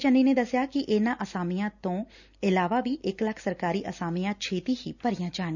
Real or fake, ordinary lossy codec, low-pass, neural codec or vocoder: real; none; 7.2 kHz; none